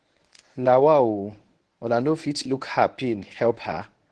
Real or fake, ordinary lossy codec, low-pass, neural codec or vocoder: fake; Opus, 16 kbps; 10.8 kHz; codec, 24 kHz, 0.9 kbps, WavTokenizer, medium speech release version 1